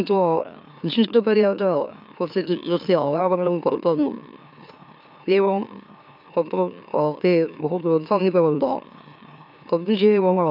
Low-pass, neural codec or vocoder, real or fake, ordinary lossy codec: 5.4 kHz; autoencoder, 44.1 kHz, a latent of 192 numbers a frame, MeloTTS; fake; none